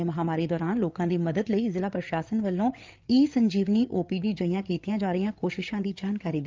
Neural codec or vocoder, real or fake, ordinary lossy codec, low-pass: codec, 16 kHz, 8 kbps, FreqCodec, larger model; fake; Opus, 32 kbps; 7.2 kHz